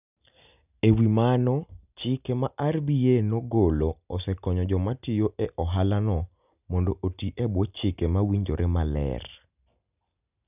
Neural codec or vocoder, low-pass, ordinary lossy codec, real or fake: none; 3.6 kHz; none; real